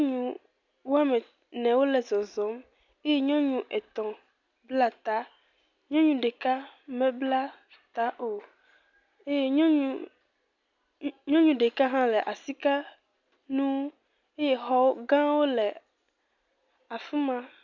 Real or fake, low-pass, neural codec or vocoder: real; 7.2 kHz; none